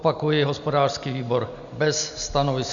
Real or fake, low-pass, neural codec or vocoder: real; 7.2 kHz; none